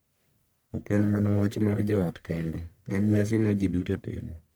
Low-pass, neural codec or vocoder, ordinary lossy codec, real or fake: none; codec, 44.1 kHz, 1.7 kbps, Pupu-Codec; none; fake